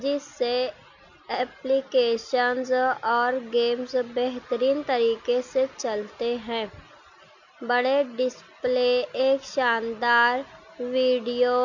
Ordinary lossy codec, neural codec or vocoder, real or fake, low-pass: none; none; real; 7.2 kHz